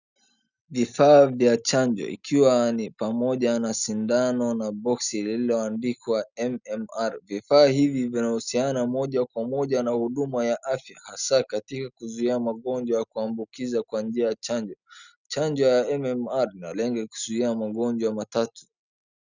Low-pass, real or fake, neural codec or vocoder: 7.2 kHz; real; none